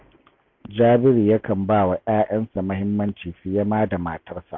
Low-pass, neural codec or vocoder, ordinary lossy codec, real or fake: 7.2 kHz; none; MP3, 48 kbps; real